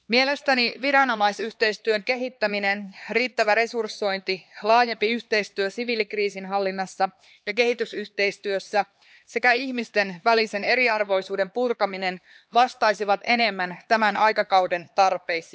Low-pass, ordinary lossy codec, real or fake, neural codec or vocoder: none; none; fake; codec, 16 kHz, 2 kbps, X-Codec, HuBERT features, trained on LibriSpeech